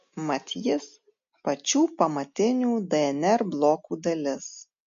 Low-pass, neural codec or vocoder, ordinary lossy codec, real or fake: 7.2 kHz; none; MP3, 48 kbps; real